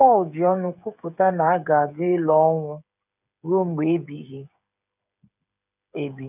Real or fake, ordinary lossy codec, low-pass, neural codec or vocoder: fake; none; 3.6 kHz; codec, 16 kHz, 8 kbps, FreqCodec, smaller model